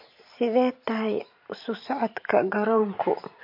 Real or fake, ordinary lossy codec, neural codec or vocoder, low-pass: fake; MP3, 32 kbps; vocoder, 44.1 kHz, 128 mel bands every 512 samples, BigVGAN v2; 5.4 kHz